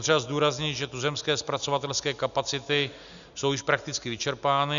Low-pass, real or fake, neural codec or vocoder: 7.2 kHz; real; none